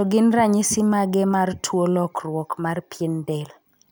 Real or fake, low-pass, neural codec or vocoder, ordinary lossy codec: real; none; none; none